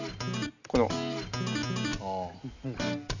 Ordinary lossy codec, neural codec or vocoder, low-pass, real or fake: none; none; 7.2 kHz; real